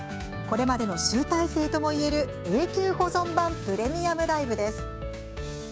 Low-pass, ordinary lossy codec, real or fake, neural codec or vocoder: none; none; fake; codec, 16 kHz, 6 kbps, DAC